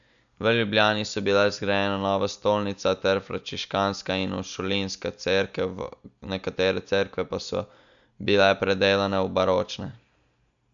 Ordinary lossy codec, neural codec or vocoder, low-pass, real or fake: none; none; 7.2 kHz; real